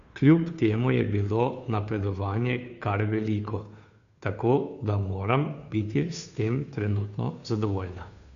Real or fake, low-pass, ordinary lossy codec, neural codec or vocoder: fake; 7.2 kHz; none; codec, 16 kHz, 2 kbps, FunCodec, trained on Chinese and English, 25 frames a second